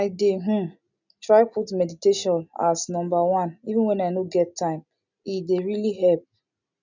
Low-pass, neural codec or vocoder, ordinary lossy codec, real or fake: 7.2 kHz; none; none; real